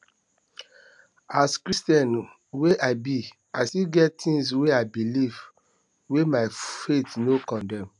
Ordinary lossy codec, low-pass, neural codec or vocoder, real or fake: none; 10.8 kHz; none; real